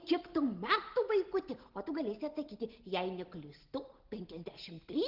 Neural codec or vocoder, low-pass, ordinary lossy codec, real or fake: none; 5.4 kHz; Opus, 16 kbps; real